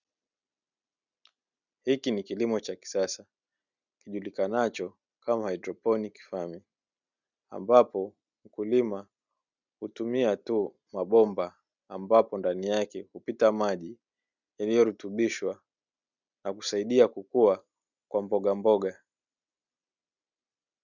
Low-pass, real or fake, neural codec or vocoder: 7.2 kHz; real; none